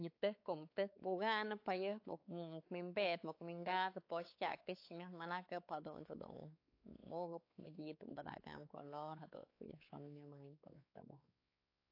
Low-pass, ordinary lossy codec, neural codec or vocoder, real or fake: 5.4 kHz; AAC, 32 kbps; codec, 16 kHz, 4 kbps, X-Codec, WavLM features, trained on Multilingual LibriSpeech; fake